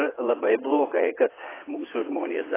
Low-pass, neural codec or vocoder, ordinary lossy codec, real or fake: 3.6 kHz; vocoder, 22.05 kHz, 80 mel bands, WaveNeXt; AAC, 16 kbps; fake